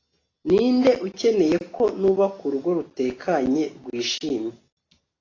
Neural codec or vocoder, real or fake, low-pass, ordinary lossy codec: none; real; 7.2 kHz; AAC, 32 kbps